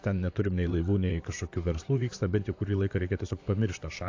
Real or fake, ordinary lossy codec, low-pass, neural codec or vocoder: fake; AAC, 48 kbps; 7.2 kHz; vocoder, 44.1 kHz, 128 mel bands, Pupu-Vocoder